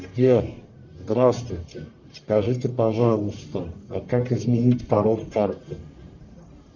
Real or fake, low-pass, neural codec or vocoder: fake; 7.2 kHz; codec, 44.1 kHz, 1.7 kbps, Pupu-Codec